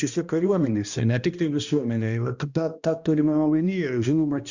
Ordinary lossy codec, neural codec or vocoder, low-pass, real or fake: Opus, 64 kbps; codec, 16 kHz, 1 kbps, X-Codec, HuBERT features, trained on balanced general audio; 7.2 kHz; fake